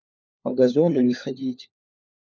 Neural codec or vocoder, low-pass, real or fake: codec, 16 kHz, 4 kbps, FunCodec, trained on LibriTTS, 50 frames a second; 7.2 kHz; fake